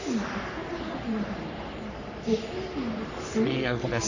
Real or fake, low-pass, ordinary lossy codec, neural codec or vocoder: fake; 7.2 kHz; none; codec, 44.1 kHz, 3.4 kbps, Pupu-Codec